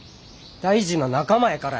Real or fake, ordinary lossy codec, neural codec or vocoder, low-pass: real; none; none; none